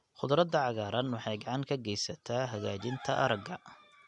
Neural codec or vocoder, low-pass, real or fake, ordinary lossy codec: none; 10.8 kHz; real; none